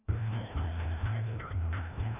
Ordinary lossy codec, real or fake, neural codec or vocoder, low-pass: none; fake; codec, 16 kHz, 1 kbps, FreqCodec, larger model; 3.6 kHz